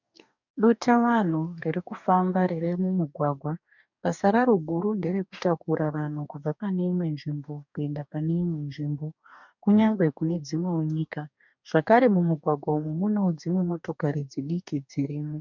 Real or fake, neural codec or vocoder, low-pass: fake; codec, 44.1 kHz, 2.6 kbps, DAC; 7.2 kHz